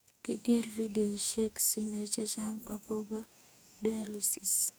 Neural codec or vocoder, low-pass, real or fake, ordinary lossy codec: codec, 44.1 kHz, 2.6 kbps, DAC; none; fake; none